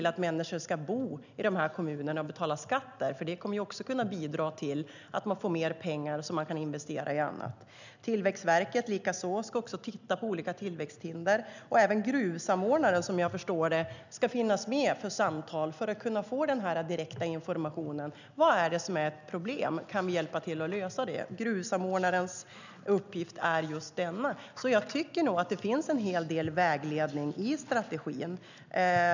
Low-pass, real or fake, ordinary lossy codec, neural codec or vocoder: 7.2 kHz; real; none; none